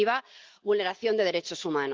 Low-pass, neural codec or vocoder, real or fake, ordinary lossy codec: 7.2 kHz; none; real; Opus, 32 kbps